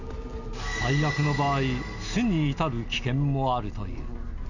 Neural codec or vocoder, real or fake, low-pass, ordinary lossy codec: none; real; 7.2 kHz; none